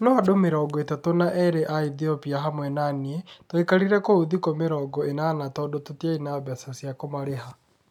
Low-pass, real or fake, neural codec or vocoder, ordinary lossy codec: 19.8 kHz; real; none; none